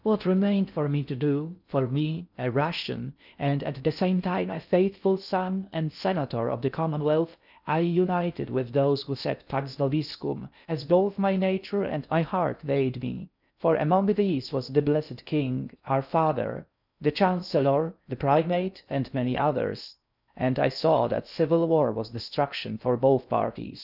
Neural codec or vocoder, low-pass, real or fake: codec, 16 kHz in and 24 kHz out, 0.6 kbps, FocalCodec, streaming, 2048 codes; 5.4 kHz; fake